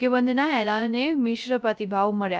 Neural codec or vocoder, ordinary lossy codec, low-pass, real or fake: codec, 16 kHz, 0.3 kbps, FocalCodec; none; none; fake